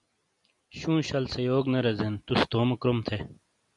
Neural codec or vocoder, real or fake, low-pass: none; real; 10.8 kHz